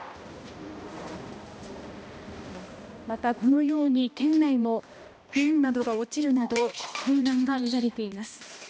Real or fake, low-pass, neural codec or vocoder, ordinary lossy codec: fake; none; codec, 16 kHz, 1 kbps, X-Codec, HuBERT features, trained on balanced general audio; none